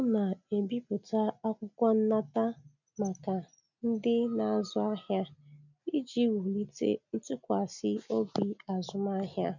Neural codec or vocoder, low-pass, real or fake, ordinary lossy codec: none; 7.2 kHz; real; none